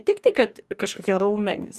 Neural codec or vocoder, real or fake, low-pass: codec, 44.1 kHz, 2.6 kbps, DAC; fake; 14.4 kHz